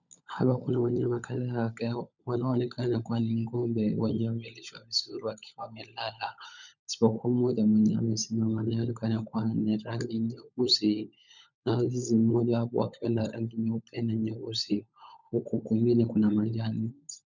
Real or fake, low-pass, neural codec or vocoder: fake; 7.2 kHz; codec, 16 kHz, 16 kbps, FunCodec, trained on LibriTTS, 50 frames a second